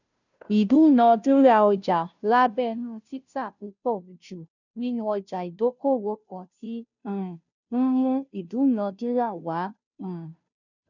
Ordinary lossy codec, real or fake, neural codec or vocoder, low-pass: none; fake; codec, 16 kHz, 0.5 kbps, FunCodec, trained on Chinese and English, 25 frames a second; 7.2 kHz